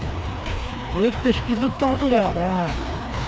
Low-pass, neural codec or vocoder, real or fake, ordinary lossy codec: none; codec, 16 kHz, 2 kbps, FreqCodec, larger model; fake; none